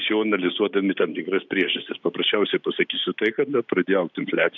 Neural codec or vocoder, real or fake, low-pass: none; real; 7.2 kHz